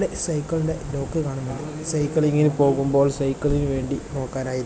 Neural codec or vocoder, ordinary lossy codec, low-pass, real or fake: none; none; none; real